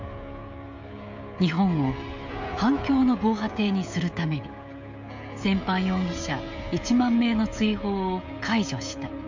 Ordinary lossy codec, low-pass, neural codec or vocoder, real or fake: MP3, 64 kbps; 7.2 kHz; codec, 16 kHz, 16 kbps, FreqCodec, smaller model; fake